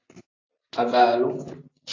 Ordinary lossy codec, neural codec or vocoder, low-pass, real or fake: AAC, 32 kbps; none; 7.2 kHz; real